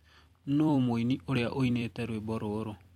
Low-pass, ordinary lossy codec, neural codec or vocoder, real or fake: 19.8 kHz; MP3, 64 kbps; vocoder, 44.1 kHz, 128 mel bands every 256 samples, BigVGAN v2; fake